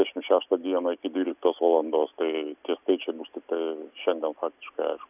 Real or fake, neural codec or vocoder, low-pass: real; none; 3.6 kHz